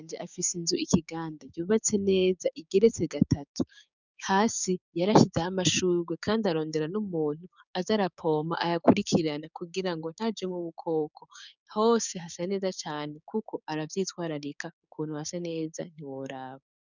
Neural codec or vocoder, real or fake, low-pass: codec, 16 kHz, 6 kbps, DAC; fake; 7.2 kHz